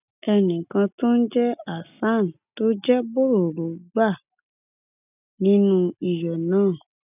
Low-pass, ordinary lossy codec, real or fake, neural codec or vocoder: 3.6 kHz; none; real; none